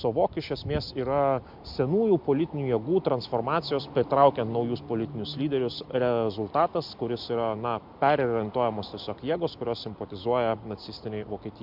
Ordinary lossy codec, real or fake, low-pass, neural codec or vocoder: MP3, 48 kbps; real; 5.4 kHz; none